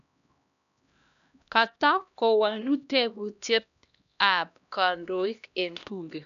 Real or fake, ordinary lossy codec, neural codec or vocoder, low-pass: fake; none; codec, 16 kHz, 1 kbps, X-Codec, HuBERT features, trained on LibriSpeech; 7.2 kHz